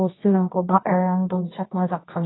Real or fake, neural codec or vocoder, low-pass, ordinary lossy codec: fake; codec, 24 kHz, 0.9 kbps, WavTokenizer, medium music audio release; 7.2 kHz; AAC, 16 kbps